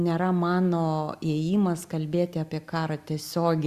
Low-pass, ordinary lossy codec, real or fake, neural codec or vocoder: 14.4 kHz; Opus, 64 kbps; real; none